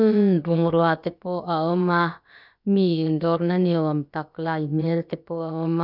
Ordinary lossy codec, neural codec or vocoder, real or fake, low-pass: none; codec, 16 kHz, about 1 kbps, DyCAST, with the encoder's durations; fake; 5.4 kHz